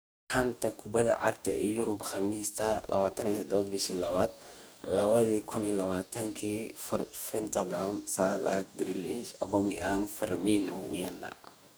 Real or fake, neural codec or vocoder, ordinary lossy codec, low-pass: fake; codec, 44.1 kHz, 2.6 kbps, DAC; none; none